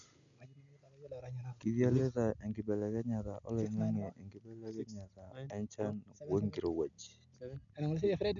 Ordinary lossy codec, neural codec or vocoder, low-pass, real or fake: none; none; 7.2 kHz; real